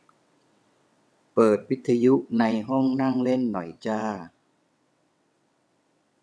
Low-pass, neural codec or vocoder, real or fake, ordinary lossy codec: none; vocoder, 22.05 kHz, 80 mel bands, WaveNeXt; fake; none